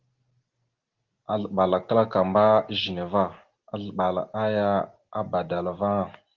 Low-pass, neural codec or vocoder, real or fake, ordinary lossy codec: 7.2 kHz; none; real; Opus, 16 kbps